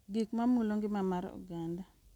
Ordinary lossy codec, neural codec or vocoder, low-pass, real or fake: none; none; 19.8 kHz; real